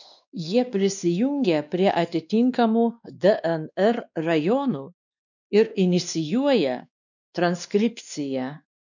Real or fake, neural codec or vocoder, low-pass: fake; codec, 16 kHz, 2 kbps, X-Codec, WavLM features, trained on Multilingual LibriSpeech; 7.2 kHz